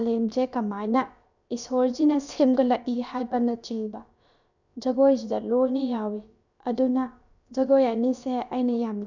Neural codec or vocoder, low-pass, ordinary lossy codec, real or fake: codec, 16 kHz, about 1 kbps, DyCAST, with the encoder's durations; 7.2 kHz; none; fake